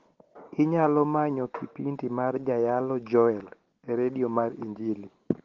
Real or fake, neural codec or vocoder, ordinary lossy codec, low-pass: real; none; Opus, 16 kbps; 7.2 kHz